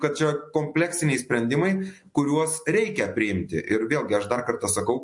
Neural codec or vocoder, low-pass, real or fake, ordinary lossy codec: none; 10.8 kHz; real; MP3, 48 kbps